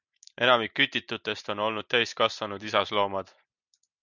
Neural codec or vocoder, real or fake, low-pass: none; real; 7.2 kHz